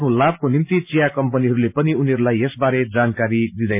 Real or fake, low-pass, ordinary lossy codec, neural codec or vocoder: real; 3.6 kHz; MP3, 32 kbps; none